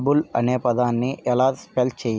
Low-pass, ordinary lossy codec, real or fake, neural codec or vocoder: none; none; real; none